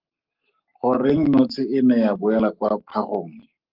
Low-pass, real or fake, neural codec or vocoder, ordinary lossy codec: 5.4 kHz; fake; codec, 44.1 kHz, 7.8 kbps, Pupu-Codec; Opus, 24 kbps